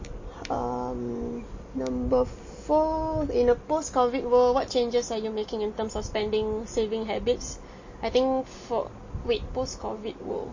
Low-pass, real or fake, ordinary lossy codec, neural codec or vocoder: 7.2 kHz; real; MP3, 32 kbps; none